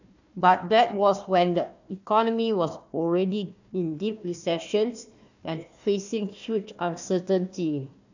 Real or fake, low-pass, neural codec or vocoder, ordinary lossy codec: fake; 7.2 kHz; codec, 16 kHz, 1 kbps, FunCodec, trained on Chinese and English, 50 frames a second; none